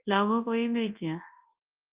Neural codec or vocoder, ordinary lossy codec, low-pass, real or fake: codec, 24 kHz, 0.9 kbps, WavTokenizer, large speech release; Opus, 24 kbps; 3.6 kHz; fake